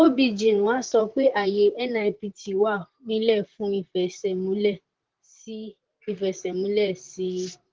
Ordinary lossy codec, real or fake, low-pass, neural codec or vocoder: Opus, 16 kbps; fake; 7.2 kHz; vocoder, 44.1 kHz, 128 mel bands, Pupu-Vocoder